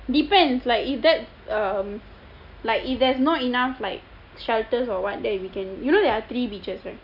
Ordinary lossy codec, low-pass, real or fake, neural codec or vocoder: none; 5.4 kHz; real; none